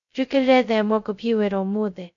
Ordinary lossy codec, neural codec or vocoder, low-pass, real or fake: none; codec, 16 kHz, 0.2 kbps, FocalCodec; 7.2 kHz; fake